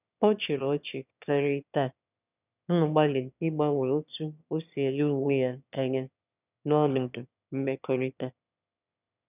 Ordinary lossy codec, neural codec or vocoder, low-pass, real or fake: none; autoencoder, 22.05 kHz, a latent of 192 numbers a frame, VITS, trained on one speaker; 3.6 kHz; fake